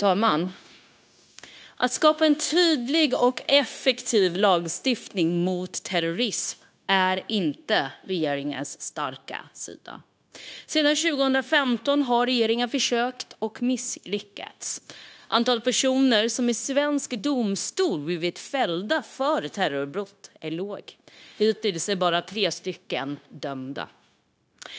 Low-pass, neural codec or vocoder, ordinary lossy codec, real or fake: none; codec, 16 kHz, 0.9 kbps, LongCat-Audio-Codec; none; fake